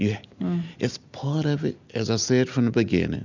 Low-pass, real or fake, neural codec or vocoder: 7.2 kHz; real; none